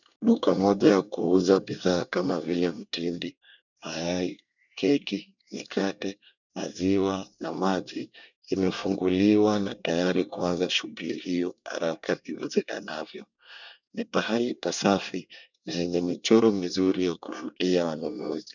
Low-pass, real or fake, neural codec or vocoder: 7.2 kHz; fake; codec, 24 kHz, 1 kbps, SNAC